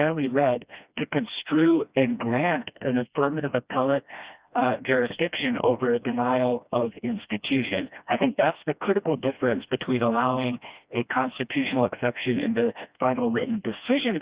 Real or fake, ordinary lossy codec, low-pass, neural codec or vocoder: fake; Opus, 64 kbps; 3.6 kHz; codec, 16 kHz, 1 kbps, FreqCodec, smaller model